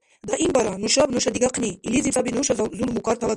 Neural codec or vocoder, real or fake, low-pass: none; real; 10.8 kHz